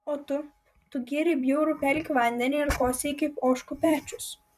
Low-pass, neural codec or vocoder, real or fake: 14.4 kHz; vocoder, 44.1 kHz, 128 mel bands every 256 samples, BigVGAN v2; fake